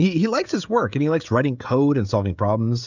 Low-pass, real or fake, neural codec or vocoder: 7.2 kHz; fake; codec, 16 kHz, 16 kbps, FreqCodec, smaller model